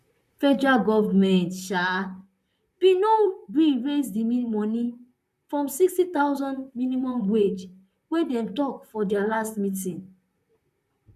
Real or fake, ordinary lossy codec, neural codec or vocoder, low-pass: fake; none; vocoder, 44.1 kHz, 128 mel bands, Pupu-Vocoder; 14.4 kHz